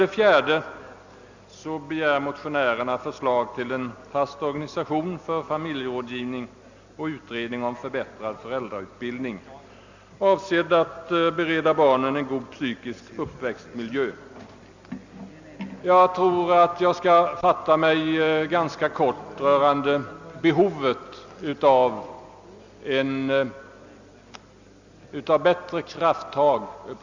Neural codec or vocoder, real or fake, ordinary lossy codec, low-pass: none; real; Opus, 64 kbps; 7.2 kHz